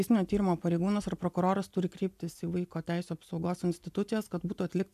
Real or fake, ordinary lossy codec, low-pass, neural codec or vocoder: real; MP3, 96 kbps; 14.4 kHz; none